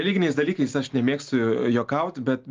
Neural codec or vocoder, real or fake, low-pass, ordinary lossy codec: none; real; 7.2 kHz; Opus, 32 kbps